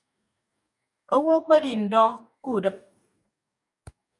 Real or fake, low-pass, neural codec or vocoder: fake; 10.8 kHz; codec, 44.1 kHz, 2.6 kbps, DAC